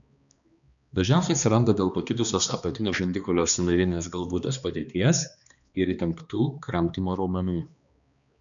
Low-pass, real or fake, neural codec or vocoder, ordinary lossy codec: 7.2 kHz; fake; codec, 16 kHz, 2 kbps, X-Codec, HuBERT features, trained on balanced general audio; MP3, 96 kbps